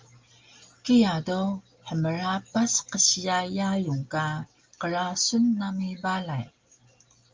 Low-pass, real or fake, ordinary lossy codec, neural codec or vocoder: 7.2 kHz; real; Opus, 32 kbps; none